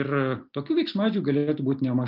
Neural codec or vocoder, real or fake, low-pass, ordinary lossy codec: none; real; 5.4 kHz; Opus, 32 kbps